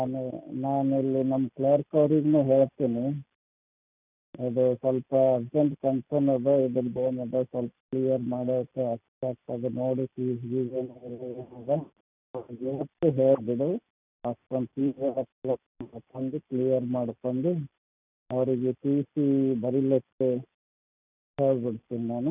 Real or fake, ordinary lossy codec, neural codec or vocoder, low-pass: real; none; none; 3.6 kHz